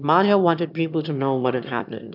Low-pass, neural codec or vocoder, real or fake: 5.4 kHz; autoencoder, 22.05 kHz, a latent of 192 numbers a frame, VITS, trained on one speaker; fake